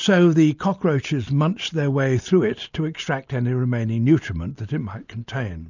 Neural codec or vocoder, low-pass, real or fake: none; 7.2 kHz; real